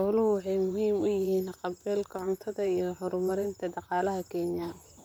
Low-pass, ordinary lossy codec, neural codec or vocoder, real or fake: none; none; vocoder, 44.1 kHz, 128 mel bands, Pupu-Vocoder; fake